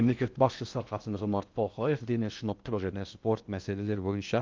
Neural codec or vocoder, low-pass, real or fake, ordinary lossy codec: codec, 16 kHz in and 24 kHz out, 0.6 kbps, FocalCodec, streaming, 4096 codes; 7.2 kHz; fake; Opus, 24 kbps